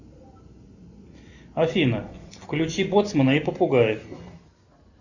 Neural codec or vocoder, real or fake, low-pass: none; real; 7.2 kHz